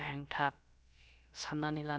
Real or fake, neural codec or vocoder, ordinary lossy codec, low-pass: fake; codec, 16 kHz, about 1 kbps, DyCAST, with the encoder's durations; none; none